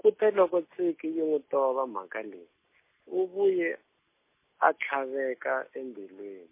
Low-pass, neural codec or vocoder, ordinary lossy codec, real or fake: 3.6 kHz; none; MP3, 24 kbps; real